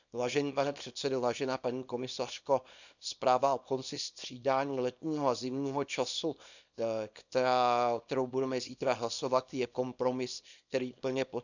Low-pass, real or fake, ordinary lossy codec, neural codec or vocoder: 7.2 kHz; fake; none; codec, 24 kHz, 0.9 kbps, WavTokenizer, small release